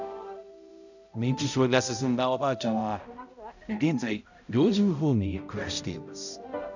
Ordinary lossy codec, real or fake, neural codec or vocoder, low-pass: none; fake; codec, 16 kHz, 0.5 kbps, X-Codec, HuBERT features, trained on balanced general audio; 7.2 kHz